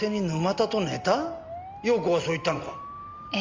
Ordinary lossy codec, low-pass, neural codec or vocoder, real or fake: Opus, 32 kbps; 7.2 kHz; none; real